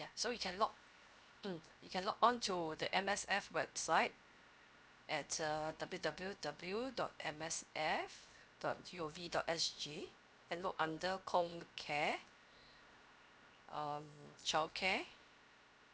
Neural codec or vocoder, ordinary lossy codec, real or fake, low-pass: codec, 16 kHz, 0.7 kbps, FocalCodec; none; fake; none